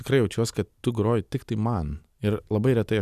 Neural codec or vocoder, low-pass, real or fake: none; 14.4 kHz; real